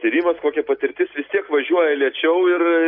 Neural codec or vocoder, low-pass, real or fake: none; 5.4 kHz; real